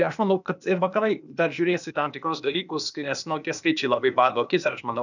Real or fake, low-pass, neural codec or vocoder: fake; 7.2 kHz; codec, 16 kHz, 0.8 kbps, ZipCodec